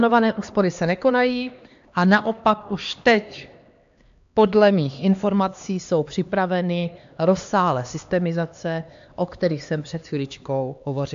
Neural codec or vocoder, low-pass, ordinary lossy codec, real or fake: codec, 16 kHz, 2 kbps, X-Codec, HuBERT features, trained on LibriSpeech; 7.2 kHz; AAC, 64 kbps; fake